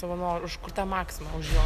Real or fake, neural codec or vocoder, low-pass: real; none; 14.4 kHz